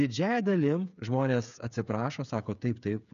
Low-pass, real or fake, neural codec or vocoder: 7.2 kHz; fake; codec, 16 kHz, 8 kbps, FreqCodec, smaller model